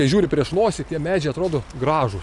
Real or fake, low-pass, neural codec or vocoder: real; 10.8 kHz; none